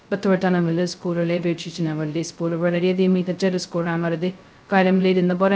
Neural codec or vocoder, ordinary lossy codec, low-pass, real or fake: codec, 16 kHz, 0.2 kbps, FocalCodec; none; none; fake